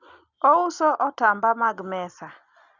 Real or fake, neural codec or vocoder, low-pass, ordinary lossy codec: real; none; 7.2 kHz; none